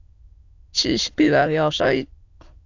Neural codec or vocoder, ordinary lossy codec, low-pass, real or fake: autoencoder, 22.05 kHz, a latent of 192 numbers a frame, VITS, trained on many speakers; AAC, 48 kbps; 7.2 kHz; fake